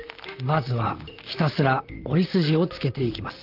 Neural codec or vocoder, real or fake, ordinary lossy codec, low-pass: vocoder, 44.1 kHz, 128 mel bands, Pupu-Vocoder; fake; Opus, 24 kbps; 5.4 kHz